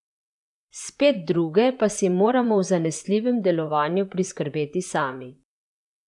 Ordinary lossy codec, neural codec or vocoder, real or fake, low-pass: none; vocoder, 44.1 kHz, 128 mel bands, Pupu-Vocoder; fake; 10.8 kHz